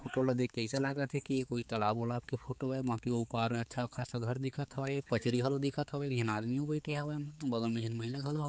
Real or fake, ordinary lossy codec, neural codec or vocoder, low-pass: fake; none; codec, 16 kHz, 4 kbps, X-Codec, HuBERT features, trained on balanced general audio; none